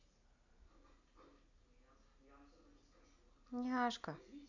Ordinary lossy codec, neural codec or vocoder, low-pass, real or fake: none; none; 7.2 kHz; real